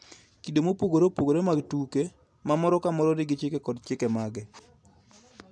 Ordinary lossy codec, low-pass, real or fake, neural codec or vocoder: none; none; real; none